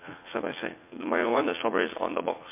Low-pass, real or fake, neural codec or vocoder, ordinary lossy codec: 3.6 kHz; fake; vocoder, 44.1 kHz, 80 mel bands, Vocos; MP3, 24 kbps